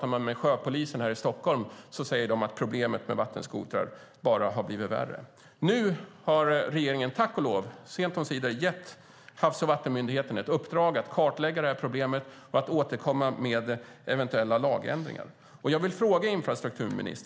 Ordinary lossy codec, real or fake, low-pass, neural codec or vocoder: none; real; none; none